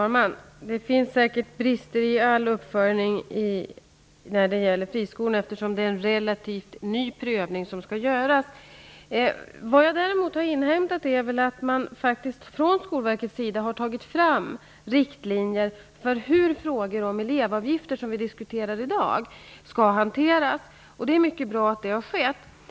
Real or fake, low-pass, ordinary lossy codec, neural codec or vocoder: real; none; none; none